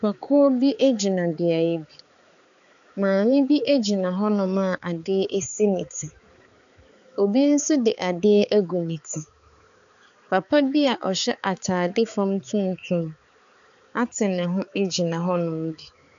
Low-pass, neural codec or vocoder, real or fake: 7.2 kHz; codec, 16 kHz, 4 kbps, X-Codec, HuBERT features, trained on balanced general audio; fake